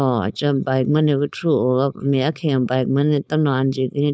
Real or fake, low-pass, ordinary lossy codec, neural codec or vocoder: fake; none; none; codec, 16 kHz, 4.8 kbps, FACodec